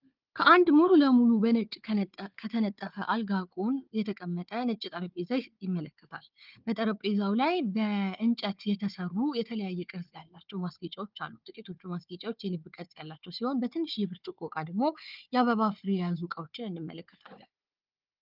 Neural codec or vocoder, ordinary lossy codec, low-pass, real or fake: codec, 16 kHz, 4 kbps, FunCodec, trained on Chinese and English, 50 frames a second; Opus, 32 kbps; 5.4 kHz; fake